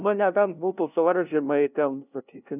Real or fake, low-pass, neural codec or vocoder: fake; 3.6 kHz; codec, 16 kHz, 0.5 kbps, FunCodec, trained on LibriTTS, 25 frames a second